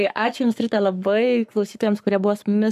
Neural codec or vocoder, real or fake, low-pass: codec, 44.1 kHz, 7.8 kbps, Pupu-Codec; fake; 14.4 kHz